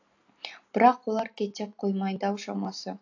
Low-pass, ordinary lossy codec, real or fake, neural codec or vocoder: 7.2 kHz; AAC, 48 kbps; real; none